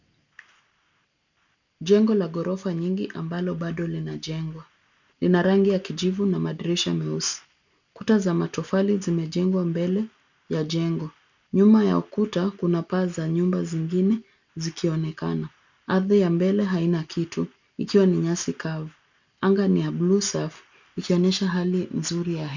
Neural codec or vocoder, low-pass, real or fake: none; 7.2 kHz; real